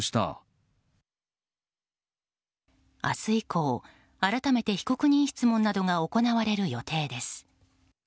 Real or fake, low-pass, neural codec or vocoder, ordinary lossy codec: real; none; none; none